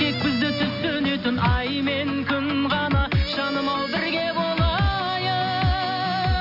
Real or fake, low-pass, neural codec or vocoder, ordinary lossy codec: real; 5.4 kHz; none; none